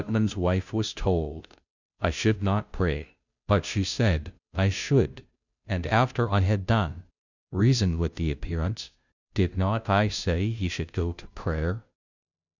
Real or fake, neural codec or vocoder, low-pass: fake; codec, 16 kHz, 0.5 kbps, FunCodec, trained on Chinese and English, 25 frames a second; 7.2 kHz